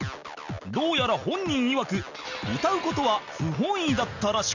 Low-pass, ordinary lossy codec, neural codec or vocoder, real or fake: 7.2 kHz; none; none; real